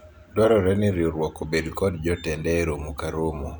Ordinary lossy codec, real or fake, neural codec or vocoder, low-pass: none; real; none; none